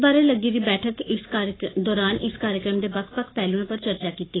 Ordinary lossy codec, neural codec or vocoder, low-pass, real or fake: AAC, 16 kbps; codec, 44.1 kHz, 7.8 kbps, Pupu-Codec; 7.2 kHz; fake